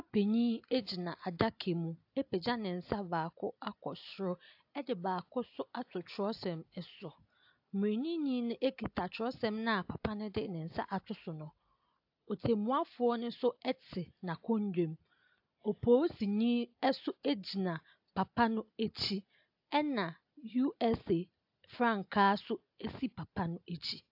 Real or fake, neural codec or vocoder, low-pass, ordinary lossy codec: real; none; 5.4 kHz; AAC, 48 kbps